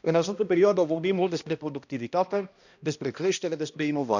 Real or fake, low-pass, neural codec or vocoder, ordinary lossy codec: fake; 7.2 kHz; codec, 16 kHz, 1 kbps, X-Codec, HuBERT features, trained on balanced general audio; none